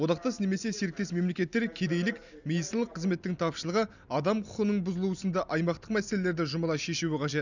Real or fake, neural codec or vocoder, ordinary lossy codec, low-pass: real; none; none; 7.2 kHz